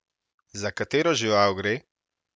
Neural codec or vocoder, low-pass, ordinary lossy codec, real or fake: none; none; none; real